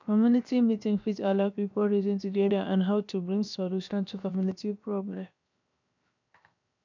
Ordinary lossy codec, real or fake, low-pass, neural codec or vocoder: none; fake; 7.2 kHz; codec, 16 kHz, 0.7 kbps, FocalCodec